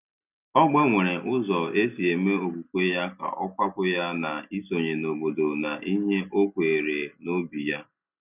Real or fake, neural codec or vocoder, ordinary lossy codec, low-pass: real; none; none; 3.6 kHz